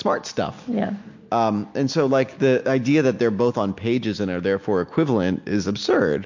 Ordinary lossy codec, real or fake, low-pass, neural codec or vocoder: MP3, 48 kbps; real; 7.2 kHz; none